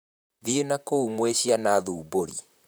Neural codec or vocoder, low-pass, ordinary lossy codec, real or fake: vocoder, 44.1 kHz, 128 mel bands, Pupu-Vocoder; none; none; fake